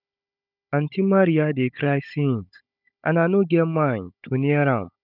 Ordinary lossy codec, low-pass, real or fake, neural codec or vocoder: none; 5.4 kHz; fake; codec, 16 kHz, 16 kbps, FunCodec, trained on Chinese and English, 50 frames a second